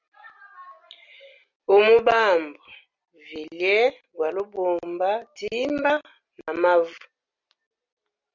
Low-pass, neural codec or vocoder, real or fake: 7.2 kHz; none; real